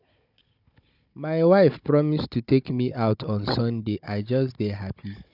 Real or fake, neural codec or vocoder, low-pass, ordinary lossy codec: fake; codec, 16 kHz, 16 kbps, FunCodec, trained on Chinese and English, 50 frames a second; 5.4 kHz; none